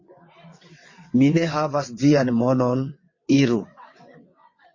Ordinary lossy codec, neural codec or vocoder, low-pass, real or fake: MP3, 32 kbps; vocoder, 22.05 kHz, 80 mel bands, WaveNeXt; 7.2 kHz; fake